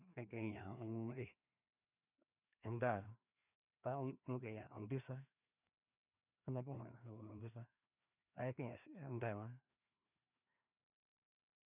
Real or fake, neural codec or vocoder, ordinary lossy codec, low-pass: fake; codec, 16 kHz, 2 kbps, FreqCodec, larger model; none; 3.6 kHz